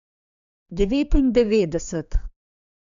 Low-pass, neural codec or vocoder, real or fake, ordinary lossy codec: 7.2 kHz; codec, 16 kHz, 4 kbps, X-Codec, HuBERT features, trained on general audio; fake; none